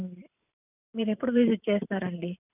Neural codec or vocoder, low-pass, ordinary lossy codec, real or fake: vocoder, 44.1 kHz, 128 mel bands every 512 samples, BigVGAN v2; 3.6 kHz; none; fake